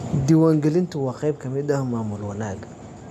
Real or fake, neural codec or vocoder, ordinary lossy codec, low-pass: real; none; none; none